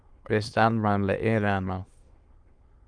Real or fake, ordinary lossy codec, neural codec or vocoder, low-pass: fake; Opus, 32 kbps; autoencoder, 22.05 kHz, a latent of 192 numbers a frame, VITS, trained on many speakers; 9.9 kHz